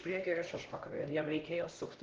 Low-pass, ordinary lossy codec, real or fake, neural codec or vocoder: 7.2 kHz; Opus, 16 kbps; fake; codec, 16 kHz, 1 kbps, X-Codec, HuBERT features, trained on LibriSpeech